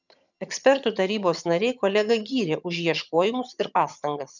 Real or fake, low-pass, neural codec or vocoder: fake; 7.2 kHz; vocoder, 22.05 kHz, 80 mel bands, HiFi-GAN